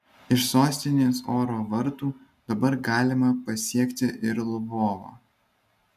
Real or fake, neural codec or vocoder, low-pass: real; none; 14.4 kHz